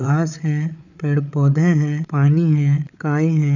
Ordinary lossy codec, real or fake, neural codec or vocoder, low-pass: none; fake; codec, 16 kHz, 8 kbps, FreqCodec, larger model; 7.2 kHz